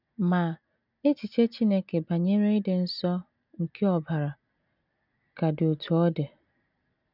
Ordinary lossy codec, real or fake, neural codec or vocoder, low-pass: none; real; none; 5.4 kHz